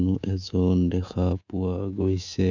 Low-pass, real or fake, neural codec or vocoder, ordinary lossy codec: 7.2 kHz; fake; codec, 24 kHz, 3.1 kbps, DualCodec; none